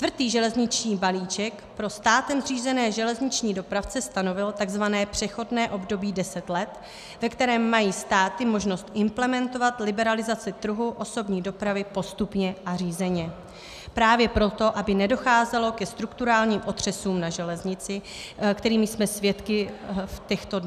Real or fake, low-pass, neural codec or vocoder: real; 14.4 kHz; none